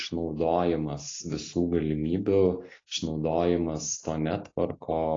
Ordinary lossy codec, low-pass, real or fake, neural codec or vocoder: AAC, 32 kbps; 7.2 kHz; real; none